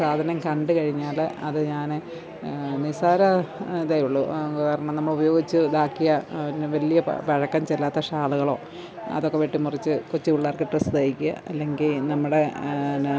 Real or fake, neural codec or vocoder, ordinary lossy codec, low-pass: real; none; none; none